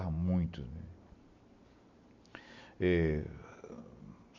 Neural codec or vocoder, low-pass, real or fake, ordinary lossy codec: none; 7.2 kHz; real; MP3, 48 kbps